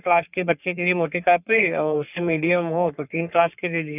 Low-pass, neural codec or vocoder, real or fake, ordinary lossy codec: 3.6 kHz; codec, 44.1 kHz, 3.4 kbps, Pupu-Codec; fake; none